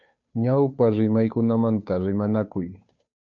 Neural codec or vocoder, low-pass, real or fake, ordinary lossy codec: codec, 16 kHz, 2 kbps, FunCodec, trained on Chinese and English, 25 frames a second; 7.2 kHz; fake; MP3, 48 kbps